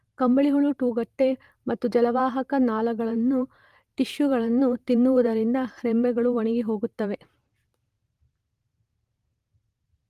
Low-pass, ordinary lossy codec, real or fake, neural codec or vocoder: 14.4 kHz; Opus, 32 kbps; fake; vocoder, 44.1 kHz, 128 mel bands every 512 samples, BigVGAN v2